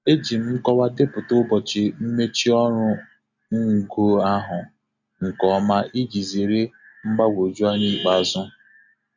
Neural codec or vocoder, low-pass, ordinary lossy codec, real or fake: none; 7.2 kHz; none; real